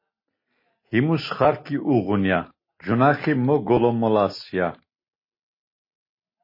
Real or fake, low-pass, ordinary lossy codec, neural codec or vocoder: real; 5.4 kHz; MP3, 24 kbps; none